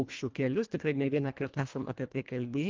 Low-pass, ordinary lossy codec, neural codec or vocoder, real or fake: 7.2 kHz; Opus, 32 kbps; codec, 24 kHz, 1.5 kbps, HILCodec; fake